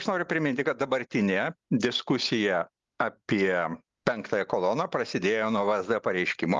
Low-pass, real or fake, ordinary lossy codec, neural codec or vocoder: 7.2 kHz; real; Opus, 32 kbps; none